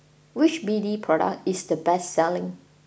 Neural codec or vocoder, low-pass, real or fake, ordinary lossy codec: none; none; real; none